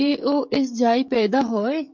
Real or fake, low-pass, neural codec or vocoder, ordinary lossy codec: fake; 7.2 kHz; codec, 16 kHz, 16 kbps, FreqCodec, smaller model; MP3, 48 kbps